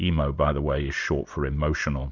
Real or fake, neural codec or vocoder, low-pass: real; none; 7.2 kHz